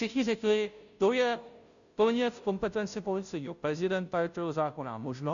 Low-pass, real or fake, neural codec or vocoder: 7.2 kHz; fake; codec, 16 kHz, 0.5 kbps, FunCodec, trained on Chinese and English, 25 frames a second